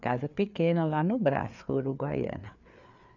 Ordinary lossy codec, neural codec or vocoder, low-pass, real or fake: none; codec, 16 kHz, 4 kbps, FreqCodec, larger model; 7.2 kHz; fake